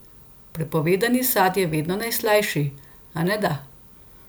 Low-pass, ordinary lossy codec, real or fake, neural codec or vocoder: none; none; real; none